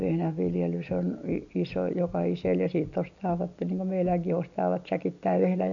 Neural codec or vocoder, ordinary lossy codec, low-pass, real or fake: none; none; 7.2 kHz; real